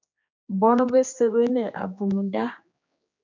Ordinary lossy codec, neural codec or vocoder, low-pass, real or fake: MP3, 64 kbps; codec, 16 kHz, 2 kbps, X-Codec, HuBERT features, trained on general audio; 7.2 kHz; fake